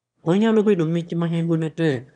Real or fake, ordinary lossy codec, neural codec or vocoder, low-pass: fake; none; autoencoder, 22.05 kHz, a latent of 192 numbers a frame, VITS, trained on one speaker; 9.9 kHz